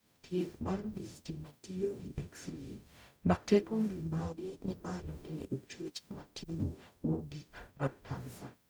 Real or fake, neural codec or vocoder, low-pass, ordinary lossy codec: fake; codec, 44.1 kHz, 0.9 kbps, DAC; none; none